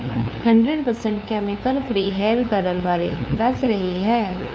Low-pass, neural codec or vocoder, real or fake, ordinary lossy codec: none; codec, 16 kHz, 2 kbps, FunCodec, trained on LibriTTS, 25 frames a second; fake; none